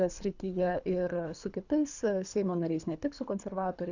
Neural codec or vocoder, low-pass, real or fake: codec, 24 kHz, 3 kbps, HILCodec; 7.2 kHz; fake